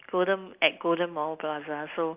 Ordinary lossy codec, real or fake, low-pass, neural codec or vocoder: Opus, 32 kbps; real; 3.6 kHz; none